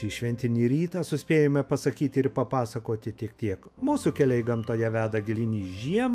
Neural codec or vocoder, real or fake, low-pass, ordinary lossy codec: autoencoder, 48 kHz, 128 numbers a frame, DAC-VAE, trained on Japanese speech; fake; 14.4 kHz; AAC, 96 kbps